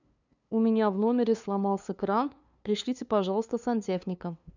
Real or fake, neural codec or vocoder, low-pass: fake; codec, 16 kHz, 2 kbps, FunCodec, trained on LibriTTS, 25 frames a second; 7.2 kHz